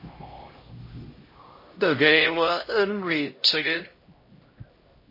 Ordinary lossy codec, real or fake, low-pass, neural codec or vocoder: MP3, 24 kbps; fake; 5.4 kHz; codec, 16 kHz, 0.5 kbps, X-Codec, HuBERT features, trained on LibriSpeech